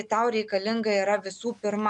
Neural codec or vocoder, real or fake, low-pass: none; real; 10.8 kHz